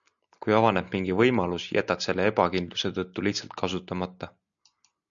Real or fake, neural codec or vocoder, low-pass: real; none; 7.2 kHz